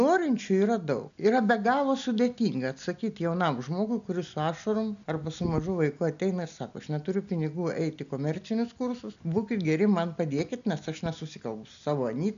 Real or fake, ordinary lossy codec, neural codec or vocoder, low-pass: real; MP3, 96 kbps; none; 7.2 kHz